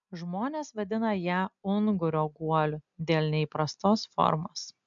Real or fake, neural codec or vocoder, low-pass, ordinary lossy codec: real; none; 7.2 kHz; MP3, 64 kbps